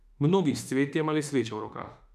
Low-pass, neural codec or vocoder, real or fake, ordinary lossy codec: 14.4 kHz; autoencoder, 48 kHz, 32 numbers a frame, DAC-VAE, trained on Japanese speech; fake; none